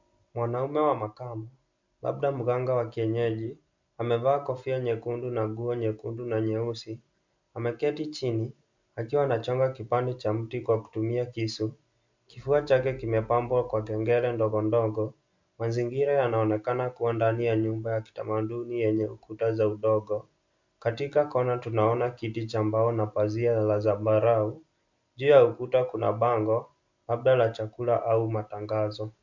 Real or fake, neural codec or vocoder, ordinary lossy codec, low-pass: real; none; MP3, 64 kbps; 7.2 kHz